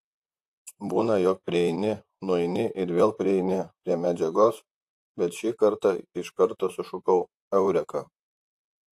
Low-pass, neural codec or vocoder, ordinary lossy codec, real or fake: 14.4 kHz; vocoder, 44.1 kHz, 128 mel bands, Pupu-Vocoder; AAC, 64 kbps; fake